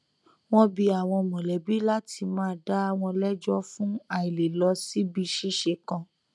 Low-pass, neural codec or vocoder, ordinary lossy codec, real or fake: none; none; none; real